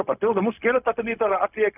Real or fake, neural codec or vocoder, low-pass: fake; codec, 16 kHz, 0.4 kbps, LongCat-Audio-Codec; 3.6 kHz